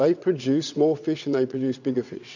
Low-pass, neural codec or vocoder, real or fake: 7.2 kHz; vocoder, 22.05 kHz, 80 mel bands, WaveNeXt; fake